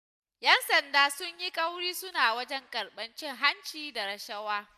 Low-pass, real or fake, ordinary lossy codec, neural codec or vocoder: 14.4 kHz; real; none; none